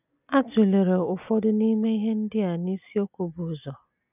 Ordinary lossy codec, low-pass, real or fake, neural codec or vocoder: none; 3.6 kHz; real; none